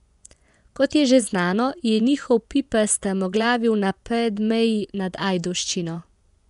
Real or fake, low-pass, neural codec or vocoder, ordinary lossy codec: fake; 10.8 kHz; vocoder, 24 kHz, 100 mel bands, Vocos; none